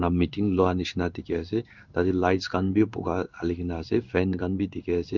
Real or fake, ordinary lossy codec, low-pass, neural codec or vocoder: fake; none; 7.2 kHz; codec, 16 kHz in and 24 kHz out, 1 kbps, XY-Tokenizer